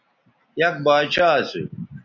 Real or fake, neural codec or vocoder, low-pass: real; none; 7.2 kHz